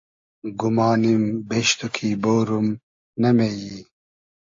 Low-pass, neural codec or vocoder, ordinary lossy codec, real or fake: 7.2 kHz; none; AAC, 48 kbps; real